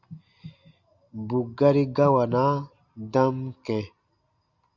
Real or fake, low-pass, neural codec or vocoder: real; 7.2 kHz; none